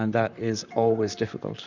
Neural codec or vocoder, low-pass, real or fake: vocoder, 44.1 kHz, 128 mel bands, Pupu-Vocoder; 7.2 kHz; fake